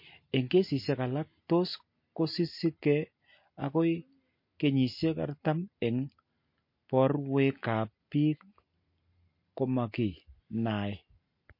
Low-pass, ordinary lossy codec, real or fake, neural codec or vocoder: 5.4 kHz; MP3, 32 kbps; real; none